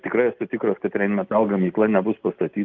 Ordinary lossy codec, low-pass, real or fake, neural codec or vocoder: Opus, 32 kbps; 7.2 kHz; real; none